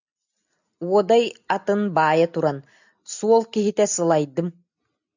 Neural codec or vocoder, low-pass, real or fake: none; 7.2 kHz; real